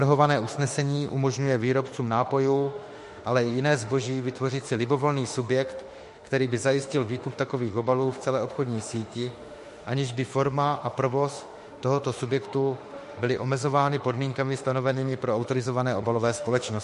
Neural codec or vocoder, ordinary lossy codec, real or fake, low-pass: autoencoder, 48 kHz, 32 numbers a frame, DAC-VAE, trained on Japanese speech; MP3, 48 kbps; fake; 14.4 kHz